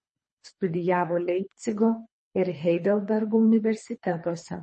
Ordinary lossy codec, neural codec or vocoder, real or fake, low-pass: MP3, 32 kbps; codec, 24 kHz, 3 kbps, HILCodec; fake; 10.8 kHz